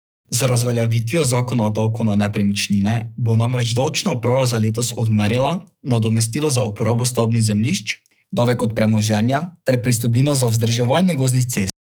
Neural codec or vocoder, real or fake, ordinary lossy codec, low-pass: codec, 44.1 kHz, 2.6 kbps, SNAC; fake; none; none